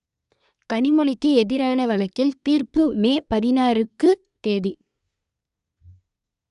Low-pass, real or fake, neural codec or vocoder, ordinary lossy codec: 10.8 kHz; fake; codec, 24 kHz, 1 kbps, SNAC; none